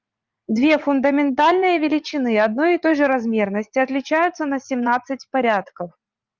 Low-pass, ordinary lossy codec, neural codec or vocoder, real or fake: 7.2 kHz; Opus, 24 kbps; vocoder, 44.1 kHz, 80 mel bands, Vocos; fake